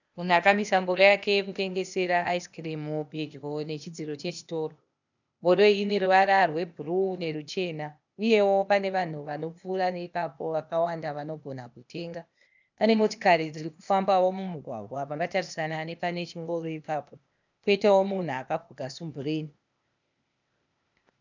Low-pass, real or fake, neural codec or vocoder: 7.2 kHz; fake; codec, 16 kHz, 0.8 kbps, ZipCodec